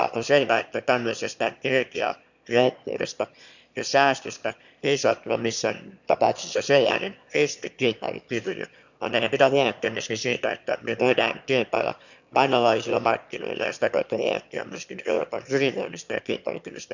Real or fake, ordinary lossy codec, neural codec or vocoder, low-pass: fake; none; autoencoder, 22.05 kHz, a latent of 192 numbers a frame, VITS, trained on one speaker; 7.2 kHz